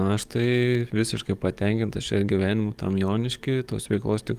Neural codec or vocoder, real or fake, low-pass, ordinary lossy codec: codec, 44.1 kHz, 7.8 kbps, DAC; fake; 14.4 kHz; Opus, 32 kbps